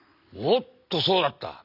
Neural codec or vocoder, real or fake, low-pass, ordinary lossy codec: none; real; 5.4 kHz; none